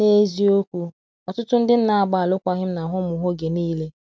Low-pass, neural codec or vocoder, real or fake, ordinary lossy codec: none; none; real; none